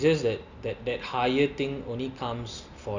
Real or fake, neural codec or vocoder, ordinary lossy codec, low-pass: real; none; none; 7.2 kHz